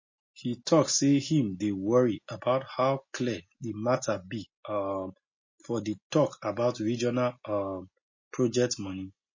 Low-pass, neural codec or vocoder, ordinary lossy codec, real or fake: 7.2 kHz; none; MP3, 32 kbps; real